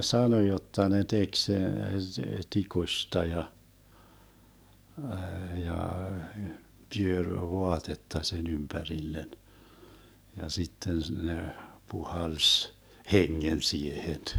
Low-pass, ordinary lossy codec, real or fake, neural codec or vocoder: none; none; fake; codec, 44.1 kHz, 7.8 kbps, DAC